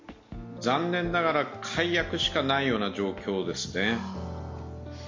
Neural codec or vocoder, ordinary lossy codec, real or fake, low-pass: none; MP3, 48 kbps; real; 7.2 kHz